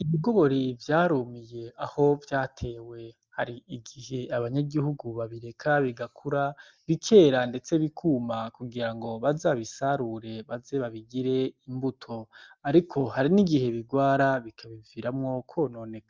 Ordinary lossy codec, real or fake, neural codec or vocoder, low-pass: Opus, 24 kbps; real; none; 7.2 kHz